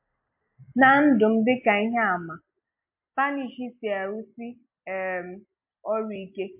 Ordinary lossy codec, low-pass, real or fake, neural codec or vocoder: MP3, 32 kbps; 3.6 kHz; real; none